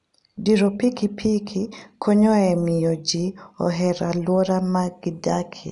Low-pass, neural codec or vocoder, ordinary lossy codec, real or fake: 10.8 kHz; none; none; real